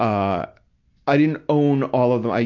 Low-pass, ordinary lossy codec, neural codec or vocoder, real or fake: 7.2 kHz; AAC, 48 kbps; none; real